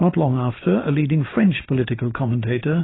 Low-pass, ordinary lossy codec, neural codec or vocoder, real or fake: 7.2 kHz; AAC, 16 kbps; none; real